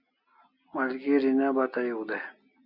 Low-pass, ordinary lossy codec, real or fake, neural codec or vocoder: 5.4 kHz; Opus, 64 kbps; real; none